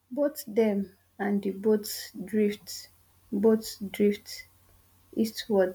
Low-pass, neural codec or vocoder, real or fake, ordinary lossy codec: none; none; real; none